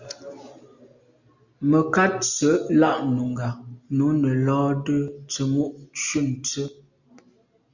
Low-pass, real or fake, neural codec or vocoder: 7.2 kHz; real; none